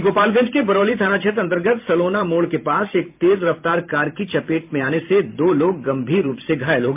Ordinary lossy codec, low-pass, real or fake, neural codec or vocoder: MP3, 32 kbps; 3.6 kHz; fake; vocoder, 44.1 kHz, 128 mel bands every 512 samples, BigVGAN v2